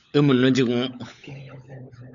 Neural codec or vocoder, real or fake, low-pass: codec, 16 kHz, 16 kbps, FunCodec, trained on LibriTTS, 50 frames a second; fake; 7.2 kHz